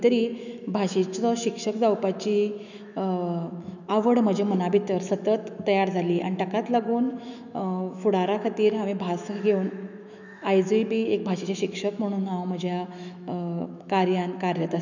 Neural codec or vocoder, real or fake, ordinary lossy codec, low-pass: autoencoder, 48 kHz, 128 numbers a frame, DAC-VAE, trained on Japanese speech; fake; none; 7.2 kHz